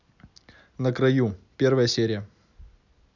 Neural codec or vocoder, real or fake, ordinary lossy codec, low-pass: none; real; none; 7.2 kHz